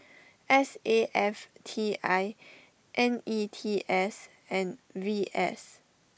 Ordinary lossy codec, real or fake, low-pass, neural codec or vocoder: none; real; none; none